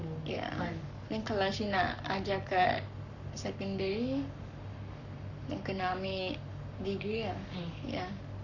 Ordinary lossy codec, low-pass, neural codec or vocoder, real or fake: none; 7.2 kHz; codec, 44.1 kHz, 7.8 kbps, Pupu-Codec; fake